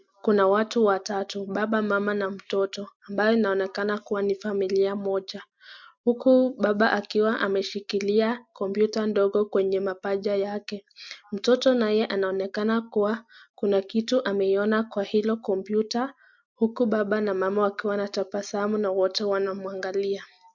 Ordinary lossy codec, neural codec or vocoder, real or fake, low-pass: MP3, 64 kbps; none; real; 7.2 kHz